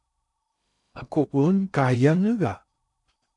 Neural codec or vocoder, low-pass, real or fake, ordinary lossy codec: codec, 16 kHz in and 24 kHz out, 0.6 kbps, FocalCodec, streaming, 2048 codes; 10.8 kHz; fake; AAC, 64 kbps